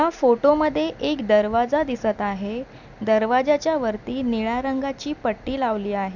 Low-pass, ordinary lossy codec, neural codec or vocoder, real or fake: 7.2 kHz; none; none; real